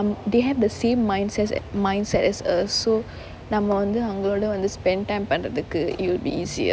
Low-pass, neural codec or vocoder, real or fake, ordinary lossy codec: none; none; real; none